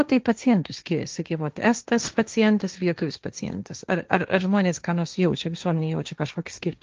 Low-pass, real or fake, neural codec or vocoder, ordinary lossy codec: 7.2 kHz; fake; codec, 16 kHz, 1.1 kbps, Voila-Tokenizer; Opus, 24 kbps